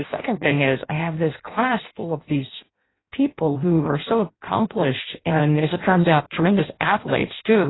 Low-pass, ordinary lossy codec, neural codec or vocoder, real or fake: 7.2 kHz; AAC, 16 kbps; codec, 16 kHz in and 24 kHz out, 0.6 kbps, FireRedTTS-2 codec; fake